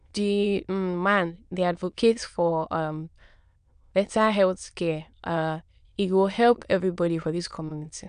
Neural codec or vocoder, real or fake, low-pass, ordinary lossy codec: autoencoder, 22.05 kHz, a latent of 192 numbers a frame, VITS, trained on many speakers; fake; 9.9 kHz; none